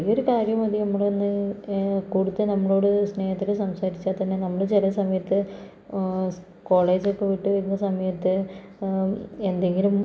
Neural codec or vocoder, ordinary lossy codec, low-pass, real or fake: none; none; none; real